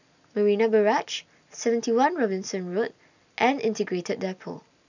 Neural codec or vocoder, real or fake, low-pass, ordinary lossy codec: none; real; 7.2 kHz; none